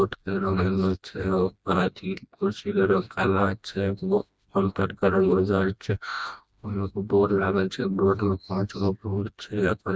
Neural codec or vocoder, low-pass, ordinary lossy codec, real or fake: codec, 16 kHz, 1 kbps, FreqCodec, smaller model; none; none; fake